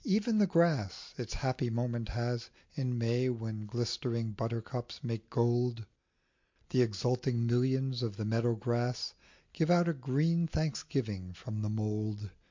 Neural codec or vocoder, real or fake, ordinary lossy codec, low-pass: none; real; MP3, 48 kbps; 7.2 kHz